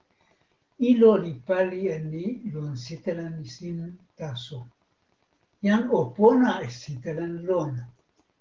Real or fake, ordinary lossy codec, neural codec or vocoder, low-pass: real; Opus, 16 kbps; none; 7.2 kHz